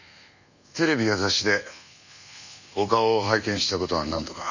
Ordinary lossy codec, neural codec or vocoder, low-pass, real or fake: none; codec, 24 kHz, 1.2 kbps, DualCodec; 7.2 kHz; fake